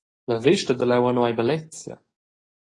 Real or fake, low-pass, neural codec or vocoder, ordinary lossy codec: fake; 10.8 kHz; codec, 44.1 kHz, 7.8 kbps, DAC; AAC, 32 kbps